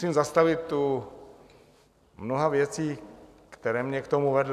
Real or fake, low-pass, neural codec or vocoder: real; 14.4 kHz; none